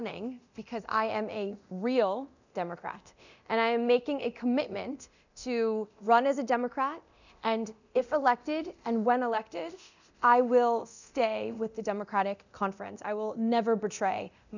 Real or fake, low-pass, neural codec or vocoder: fake; 7.2 kHz; codec, 24 kHz, 0.9 kbps, DualCodec